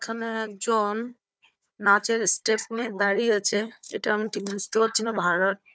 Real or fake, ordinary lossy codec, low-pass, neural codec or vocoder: fake; none; none; codec, 16 kHz, 4 kbps, FunCodec, trained on Chinese and English, 50 frames a second